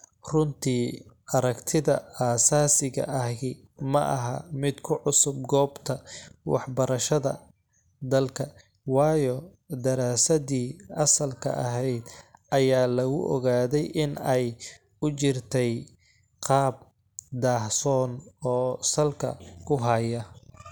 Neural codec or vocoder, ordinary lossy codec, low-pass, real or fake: none; none; none; real